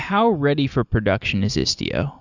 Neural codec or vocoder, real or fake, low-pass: none; real; 7.2 kHz